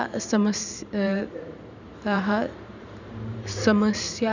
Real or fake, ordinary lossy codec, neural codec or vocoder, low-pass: fake; none; vocoder, 44.1 kHz, 128 mel bands every 512 samples, BigVGAN v2; 7.2 kHz